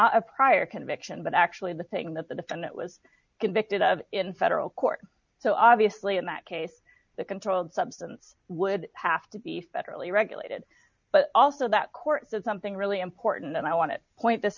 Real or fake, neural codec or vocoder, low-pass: real; none; 7.2 kHz